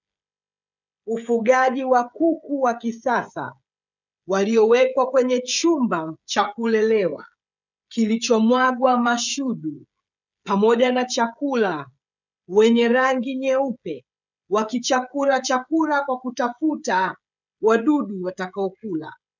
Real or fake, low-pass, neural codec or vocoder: fake; 7.2 kHz; codec, 16 kHz, 16 kbps, FreqCodec, smaller model